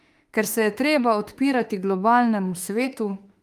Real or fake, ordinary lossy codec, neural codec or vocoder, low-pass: fake; Opus, 32 kbps; autoencoder, 48 kHz, 32 numbers a frame, DAC-VAE, trained on Japanese speech; 14.4 kHz